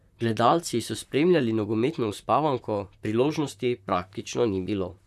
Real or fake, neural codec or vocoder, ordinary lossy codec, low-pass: fake; vocoder, 44.1 kHz, 128 mel bands, Pupu-Vocoder; none; 14.4 kHz